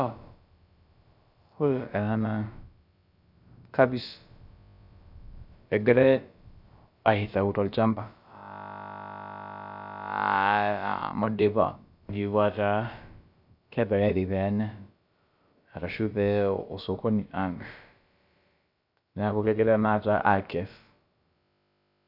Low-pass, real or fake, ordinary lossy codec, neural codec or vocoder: 5.4 kHz; fake; none; codec, 16 kHz, about 1 kbps, DyCAST, with the encoder's durations